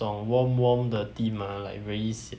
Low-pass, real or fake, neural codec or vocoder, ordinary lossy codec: none; real; none; none